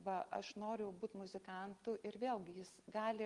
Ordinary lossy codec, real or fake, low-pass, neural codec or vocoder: Opus, 24 kbps; real; 10.8 kHz; none